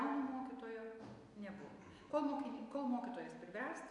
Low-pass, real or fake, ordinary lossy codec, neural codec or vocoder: 10.8 kHz; real; AAC, 64 kbps; none